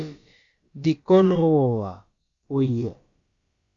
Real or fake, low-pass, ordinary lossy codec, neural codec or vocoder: fake; 7.2 kHz; Opus, 64 kbps; codec, 16 kHz, about 1 kbps, DyCAST, with the encoder's durations